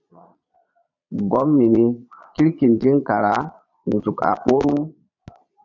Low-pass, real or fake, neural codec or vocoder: 7.2 kHz; fake; vocoder, 22.05 kHz, 80 mel bands, Vocos